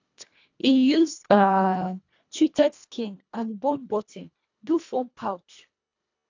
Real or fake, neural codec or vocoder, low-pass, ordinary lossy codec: fake; codec, 24 kHz, 1.5 kbps, HILCodec; 7.2 kHz; AAC, 48 kbps